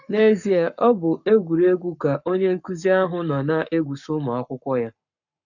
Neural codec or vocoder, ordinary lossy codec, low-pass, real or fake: codec, 44.1 kHz, 7.8 kbps, Pupu-Codec; none; 7.2 kHz; fake